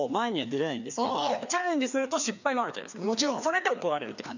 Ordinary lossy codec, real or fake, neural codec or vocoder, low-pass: none; fake; codec, 16 kHz, 2 kbps, FreqCodec, larger model; 7.2 kHz